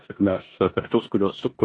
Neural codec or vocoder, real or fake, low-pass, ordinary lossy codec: codec, 16 kHz in and 24 kHz out, 0.9 kbps, LongCat-Audio-Codec, four codebook decoder; fake; 10.8 kHz; AAC, 32 kbps